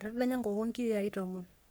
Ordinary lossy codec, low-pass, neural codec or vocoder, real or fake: none; none; codec, 44.1 kHz, 3.4 kbps, Pupu-Codec; fake